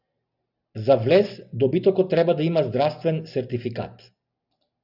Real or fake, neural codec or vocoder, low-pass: real; none; 5.4 kHz